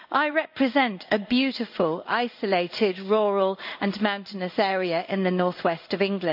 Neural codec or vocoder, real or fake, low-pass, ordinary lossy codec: codec, 16 kHz in and 24 kHz out, 1 kbps, XY-Tokenizer; fake; 5.4 kHz; none